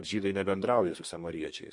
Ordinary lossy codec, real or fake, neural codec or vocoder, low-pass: MP3, 48 kbps; fake; codec, 32 kHz, 1.9 kbps, SNAC; 10.8 kHz